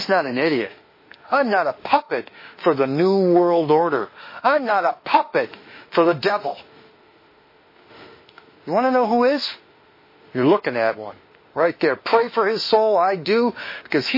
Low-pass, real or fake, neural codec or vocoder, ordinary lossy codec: 5.4 kHz; fake; autoencoder, 48 kHz, 32 numbers a frame, DAC-VAE, trained on Japanese speech; MP3, 24 kbps